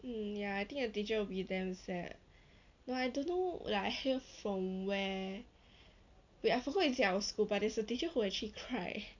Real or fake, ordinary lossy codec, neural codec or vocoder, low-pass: real; none; none; 7.2 kHz